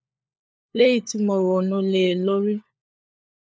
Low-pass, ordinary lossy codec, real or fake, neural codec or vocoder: none; none; fake; codec, 16 kHz, 4 kbps, FunCodec, trained on LibriTTS, 50 frames a second